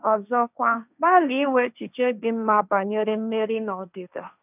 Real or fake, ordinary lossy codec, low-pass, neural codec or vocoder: fake; none; 3.6 kHz; codec, 16 kHz, 1.1 kbps, Voila-Tokenizer